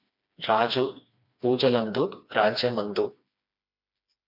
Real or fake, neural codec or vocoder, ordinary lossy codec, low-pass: fake; codec, 16 kHz, 2 kbps, FreqCodec, smaller model; MP3, 48 kbps; 5.4 kHz